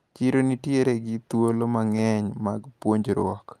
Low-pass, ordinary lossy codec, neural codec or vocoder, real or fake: 14.4 kHz; Opus, 32 kbps; none; real